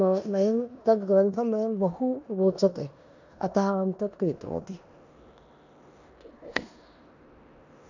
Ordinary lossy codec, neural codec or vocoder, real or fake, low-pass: none; codec, 16 kHz in and 24 kHz out, 0.9 kbps, LongCat-Audio-Codec, four codebook decoder; fake; 7.2 kHz